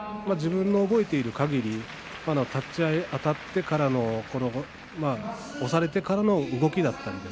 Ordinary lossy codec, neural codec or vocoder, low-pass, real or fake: none; none; none; real